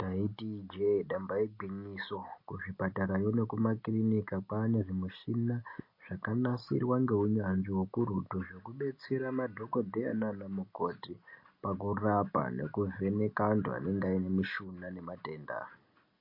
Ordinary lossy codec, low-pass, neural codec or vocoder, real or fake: MP3, 32 kbps; 5.4 kHz; none; real